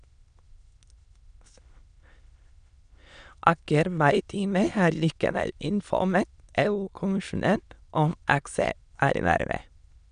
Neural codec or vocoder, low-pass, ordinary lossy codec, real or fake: autoencoder, 22.05 kHz, a latent of 192 numbers a frame, VITS, trained on many speakers; 9.9 kHz; none; fake